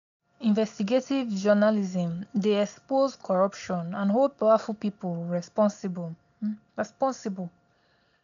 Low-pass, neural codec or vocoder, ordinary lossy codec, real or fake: 7.2 kHz; none; none; real